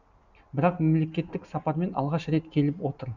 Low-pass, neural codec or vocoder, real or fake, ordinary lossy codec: 7.2 kHz; none; real; none